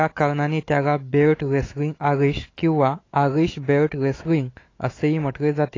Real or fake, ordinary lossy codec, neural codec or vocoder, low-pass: fake; AAC, 32 kbps; autoencoder, 48 kHz, 128 numbers a frame, DAC-VAE, trained on Japanese speech; 7.2 kHz